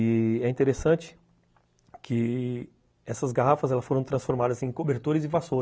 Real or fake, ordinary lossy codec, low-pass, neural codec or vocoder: real; none; none; none